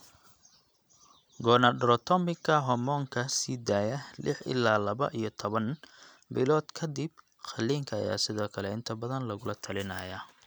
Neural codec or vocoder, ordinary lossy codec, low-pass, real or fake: none; none; none; real